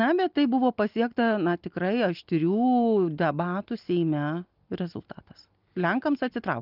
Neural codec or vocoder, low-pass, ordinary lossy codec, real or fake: none; 5.4 kHz; Opus, 32 kbps; real